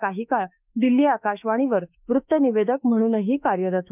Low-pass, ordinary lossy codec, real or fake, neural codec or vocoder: 3.6 kHz; none; fake; autoencoder, 48 kHz, 32 numbers a frame, DAC-VAE, trained on Japanese speech